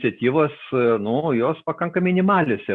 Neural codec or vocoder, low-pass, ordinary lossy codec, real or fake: none; 10.8 kHz; Opus, 64 kbps; real